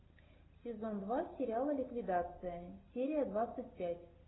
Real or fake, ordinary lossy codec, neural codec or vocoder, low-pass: real; AAC, 16 kbps; none; 7.2 kHz